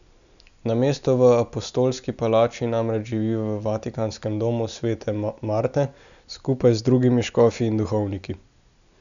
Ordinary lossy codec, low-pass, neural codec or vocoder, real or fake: none; 7.2 kHz; none; real